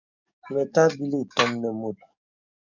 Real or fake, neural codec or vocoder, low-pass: fake; codec, 44.1 kHz, 7.8 kbps, DAC; 7.2 kHz